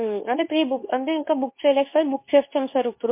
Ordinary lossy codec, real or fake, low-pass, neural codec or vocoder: MP3, 24 kbps; fake; 3.6 kHz; codec, 24 kHz, 0.9 kbps, WavTokenizer, medium speech release version 2